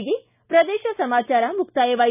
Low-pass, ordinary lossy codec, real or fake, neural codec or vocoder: 3.6 kHz; none; real; none